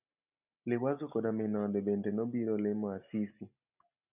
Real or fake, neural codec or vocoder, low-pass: real; none; 3.6 kHz